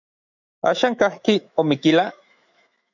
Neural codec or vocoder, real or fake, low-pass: autoencoder, 48 kHz, 128 numbers a frame, DAC-VAE, trained on Japanese speech; fake; 7.2 kHz